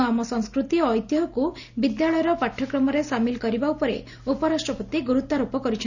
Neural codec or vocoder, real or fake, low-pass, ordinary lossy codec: none; real; 7.2 kHz; none